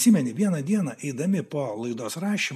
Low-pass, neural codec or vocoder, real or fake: 14.4 kHz; none; real